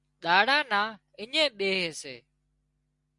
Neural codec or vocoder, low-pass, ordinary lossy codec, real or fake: none; 10.8 kHz; Opus, 64 kbps; real